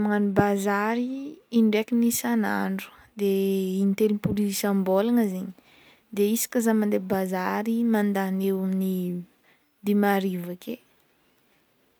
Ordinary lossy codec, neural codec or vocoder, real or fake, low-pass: none; none; real; none